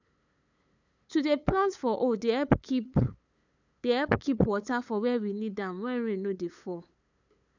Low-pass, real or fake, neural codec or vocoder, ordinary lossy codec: 7.2 kHz; fake; codec, 16 kHz, 8 kbps, FunCodec, trained on LibriTTS, 25 frames a second; none